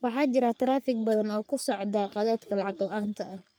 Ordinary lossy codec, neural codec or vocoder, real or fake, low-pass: none; codec, 44.1 kHz, 3.4 kbps, Pupu-Codec; fake; none